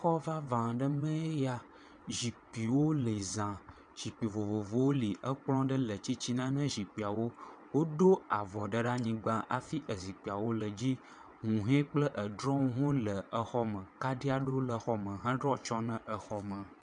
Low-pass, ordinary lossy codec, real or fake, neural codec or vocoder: 9.9 kHz; MP3, 96 kbps; fake; vocoder, 22.05 kHz, 80 mel bands, WaveNeXt